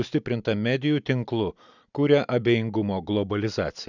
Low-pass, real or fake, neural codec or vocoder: 7.2 kHz; real; none